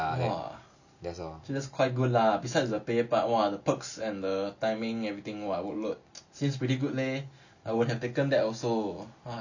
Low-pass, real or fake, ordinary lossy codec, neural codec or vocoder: 7.2 kHz; real; none; none